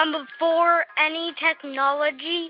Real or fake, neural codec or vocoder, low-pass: real; none; 5.4 kHz